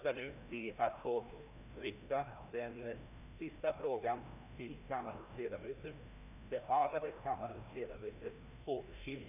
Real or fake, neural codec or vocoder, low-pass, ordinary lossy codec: fake; codec, 16 kHz, 1 kbps, FreqCodec, larger model; 3.6 kHz; none